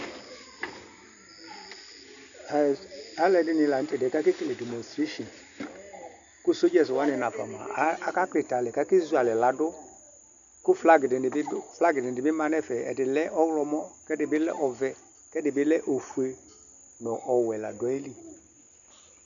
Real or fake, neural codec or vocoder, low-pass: real; none; 7.2 kHz